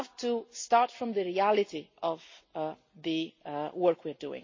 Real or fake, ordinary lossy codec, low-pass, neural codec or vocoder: real; MP3, 32 kbps; 7.2 kHz; none